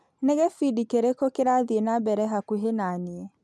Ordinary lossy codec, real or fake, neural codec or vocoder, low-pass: none; real; none; none